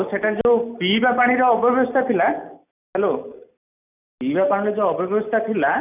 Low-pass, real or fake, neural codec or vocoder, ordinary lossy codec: 3.6 kHz; real; none; none